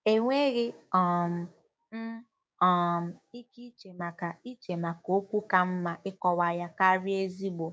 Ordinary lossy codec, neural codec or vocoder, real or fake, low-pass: none; codec, 16 kHz, 6 kbps, DAC; fake; none